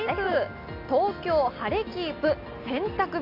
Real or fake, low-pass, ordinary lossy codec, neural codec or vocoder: real; 5.4 kHz; MP3, 48 kbps; none